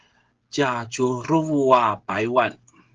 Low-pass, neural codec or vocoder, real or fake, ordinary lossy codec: 7.2 kHz; codec, 16 kHz, 16 kbps, FreqCodec, smaller model; fake; Opus, 16 kbps